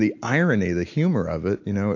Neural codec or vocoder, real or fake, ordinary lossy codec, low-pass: none; real; AAC, 48 kbps; 7.2 kHz